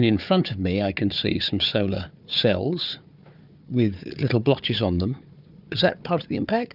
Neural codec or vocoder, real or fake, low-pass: codec, 16 kHz, 4 kbps, FunCodec, trained on Chinese and English, 50 frames a second; fake; 5.4 kHz